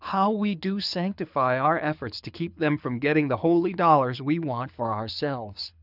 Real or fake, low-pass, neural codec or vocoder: fake; 5.4 kHz; codec, 24 kHz, 6 kbps, HILCodec